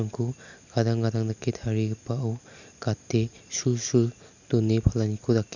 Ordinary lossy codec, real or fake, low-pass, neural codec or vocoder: none; real; 7.2 kHz; none